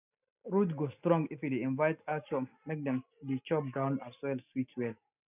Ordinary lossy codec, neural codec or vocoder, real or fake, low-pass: none; none; real; 3.6 kHz